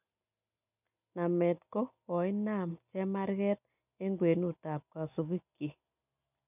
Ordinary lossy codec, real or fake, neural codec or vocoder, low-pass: none; real; none; 3.6 kHz